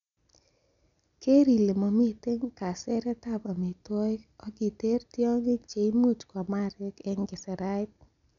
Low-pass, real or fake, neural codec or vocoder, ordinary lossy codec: 7.2 kHz; real; none; none